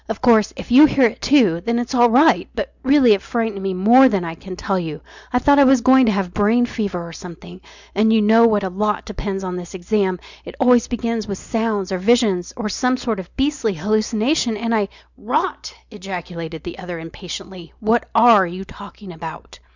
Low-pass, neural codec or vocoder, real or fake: 7.2 kHz; none; real